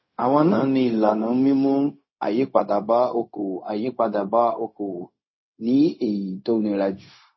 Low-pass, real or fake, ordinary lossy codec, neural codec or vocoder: 7.2 kHz; fake; MP3, 24 kbps; codec, 16 kHz, 0.4 kbps, LongCat-Audio-Codec